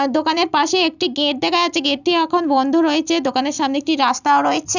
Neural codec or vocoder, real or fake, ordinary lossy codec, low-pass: none; real; none; 7.2 kHz